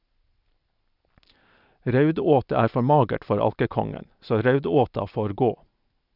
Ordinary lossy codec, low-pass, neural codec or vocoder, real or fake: none; 5.4 kHz; none; real